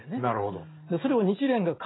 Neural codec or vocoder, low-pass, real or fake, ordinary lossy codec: none; 7.2 kHz; real; AAC, 16 kbps